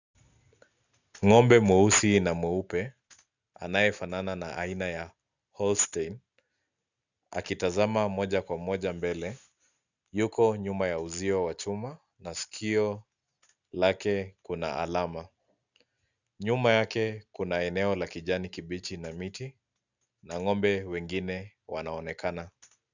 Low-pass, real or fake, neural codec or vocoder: 7.2 kHz; real; none